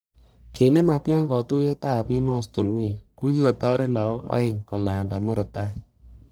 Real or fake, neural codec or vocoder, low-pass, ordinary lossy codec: fake; codec, 44.1 kHz, 1.7 kbps, Pupu-Codec; none; none